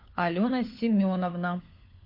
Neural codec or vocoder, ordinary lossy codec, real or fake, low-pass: codec, 16 kHz, 4 kbps, FunCodec, trained on LibriTTS, 50 frames a second; MP3, 32 kbps; fake; 5.4 kHz